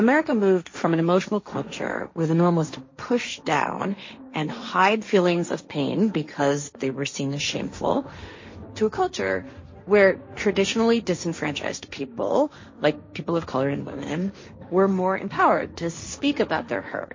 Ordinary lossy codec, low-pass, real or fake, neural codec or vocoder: MP3, 32 kbps; 7.2 kHz; fake; codec, 16 kHz, 1.1 kbps, Voila-Tokenizer